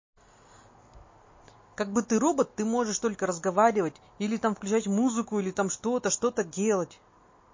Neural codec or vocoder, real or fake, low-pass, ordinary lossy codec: none; real; 7.2 kHz; MP3, 32 kbps